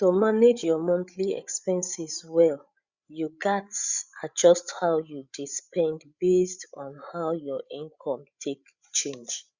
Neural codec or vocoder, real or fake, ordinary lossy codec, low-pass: codec, 16 kHz, 8 kbps, FreqCodec, larger model; fake; Opus, 64 kbps; 7.2 kHz